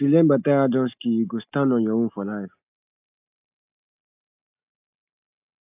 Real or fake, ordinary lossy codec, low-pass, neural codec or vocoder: real; AAC, 32 kbps; 3.6 kHz; none